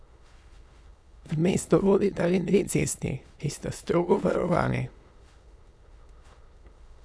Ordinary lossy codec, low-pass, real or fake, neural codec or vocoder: none; none; fake; autoencoder, 22.05 kHz, a latent of 192 numbers a frame, VITS, trained on many speakers